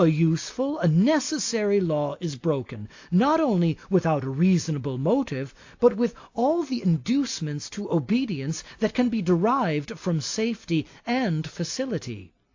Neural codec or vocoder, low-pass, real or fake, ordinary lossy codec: none; 7.2 kHz; real; AAC, 48 kbps